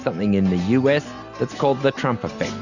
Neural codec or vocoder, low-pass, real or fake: none; 7.2 kHz; real